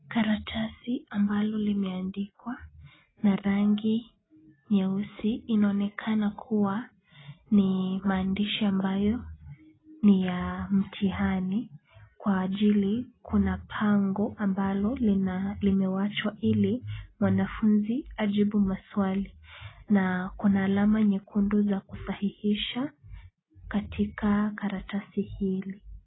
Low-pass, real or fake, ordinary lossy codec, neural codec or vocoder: 7.2 kHz; real; AAC, 16 kbps; none